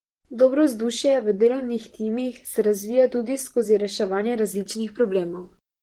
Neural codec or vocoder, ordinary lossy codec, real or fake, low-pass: vocoder, 44.1 kHz, 128 mel bands, Pupu-Vocoder; Opus, 16 kbps; fake; 19.8 kHz